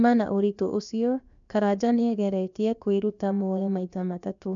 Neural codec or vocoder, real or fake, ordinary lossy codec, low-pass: codec, 16 kHz, about 1 kbps, DyCAST, with the encoder's durations; fake; none; 7.2 kHz